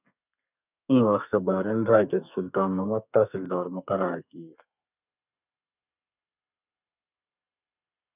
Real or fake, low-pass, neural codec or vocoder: fake; 3.6 kHz; codec, 32 kHz, 1.9 kbps, SNAC